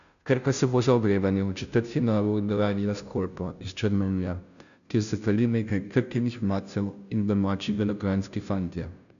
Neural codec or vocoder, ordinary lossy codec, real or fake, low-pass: codec, 16 kHz, 0.5 kbps, FunCodec, trained on Chinese and English, 25 frames a second; none; fake; 7.2 kHz